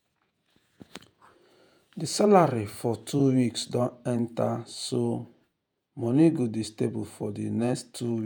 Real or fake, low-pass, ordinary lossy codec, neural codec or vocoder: fake; none; none; vocoder, 48 kHz, 128 mel bands, Vocos